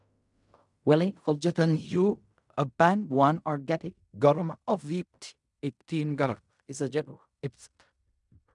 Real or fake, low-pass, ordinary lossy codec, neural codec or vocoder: fake; 10.8 kHz; MP3, 96 kbps; codec, 16 kHz in and 24 kHz out, 0.4 kbps, LongCat-Audio-Codec, fine tuned four codebook decoder